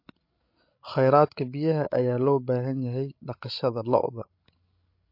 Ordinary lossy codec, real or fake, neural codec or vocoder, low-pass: MP3, 32 kbps; fake; codec, 16 kHz, 16 kbps, FreqCodec, larger model; 5.4 kHz